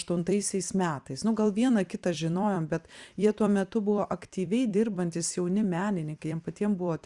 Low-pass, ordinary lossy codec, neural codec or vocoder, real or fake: 10.8 kHz; Opus, 64 kbps; vocoder, 44.1 kHz, 128 mel bands every 256 samples, BigVGAN v2; fake